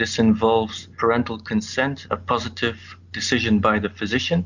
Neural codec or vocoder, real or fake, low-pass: none; real; 7.2 kHz